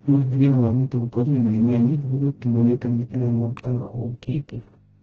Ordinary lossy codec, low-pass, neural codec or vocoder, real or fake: Opus, 16 kbps; 7.2 kHz; codec, 16 kHz, 0.5 kbps, FreqCodec, smaller model; fake